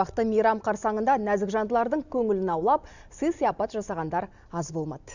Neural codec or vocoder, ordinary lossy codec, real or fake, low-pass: none; none; real; 7.2 kHz